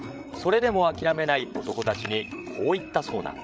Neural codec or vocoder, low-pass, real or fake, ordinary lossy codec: codec, 16 kHz, 8 kbps, FreqCodec, larger model; none; fake; none